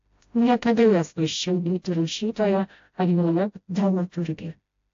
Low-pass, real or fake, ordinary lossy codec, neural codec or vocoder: 7.2 kHz; fake; AAC, 48 kbps; codec, 16 kHz, 0.5 kbps, FreqCodec, smaller model